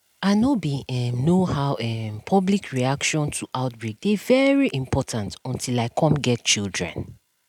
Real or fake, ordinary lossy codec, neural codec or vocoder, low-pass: real; none; none; 19.8 kHz